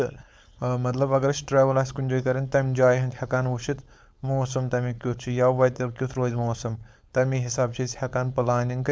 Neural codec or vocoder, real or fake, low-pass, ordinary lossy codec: codec, 16 kHz, 4.8 kbps, FACodec; fake; none; none